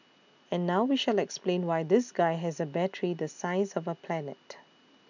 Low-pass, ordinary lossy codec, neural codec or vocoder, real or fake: 7.2 kHz; none; none; real